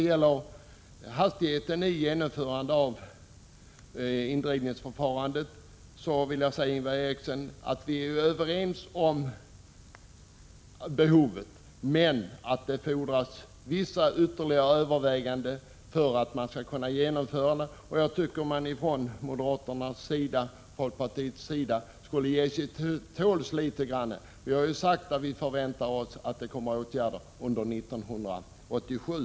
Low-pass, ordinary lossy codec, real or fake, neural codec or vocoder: none; none; real; none